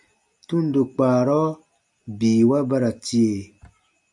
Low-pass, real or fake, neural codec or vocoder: 10.8 kHz; real; none